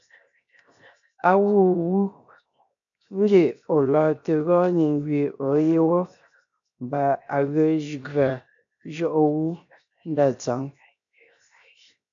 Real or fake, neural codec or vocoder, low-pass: fake; codec, 16 kHz, 0.7 kbps, FocalCodec; 7.2 kHz